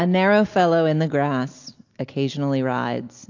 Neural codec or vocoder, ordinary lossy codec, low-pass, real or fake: none; AAC, 48 kbps; 7.2 kHz; real